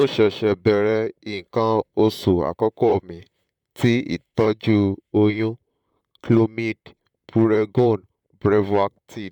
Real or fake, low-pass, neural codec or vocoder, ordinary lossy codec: fake; 19.8 kHz; vocoder, 44.1 kHz, 128 mel bands, Pupu-Vocoder; none